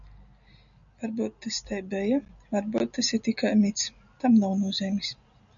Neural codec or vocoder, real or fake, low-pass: none; real; 7.2 kHz